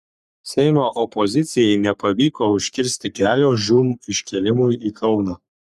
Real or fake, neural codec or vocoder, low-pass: fake; codec, 44.1 kHz, 3.4 kbps, Pupu-Codec; 14.4 kHz